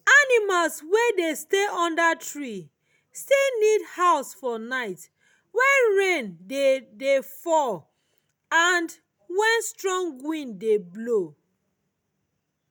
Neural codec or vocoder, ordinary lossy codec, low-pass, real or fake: none; none; none; real